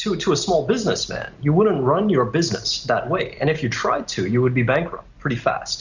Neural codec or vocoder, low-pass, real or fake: none; 7.2 kHz; real